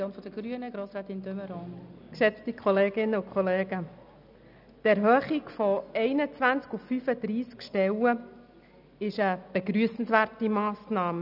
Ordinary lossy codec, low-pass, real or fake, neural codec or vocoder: none; 5.4 kHz; real; none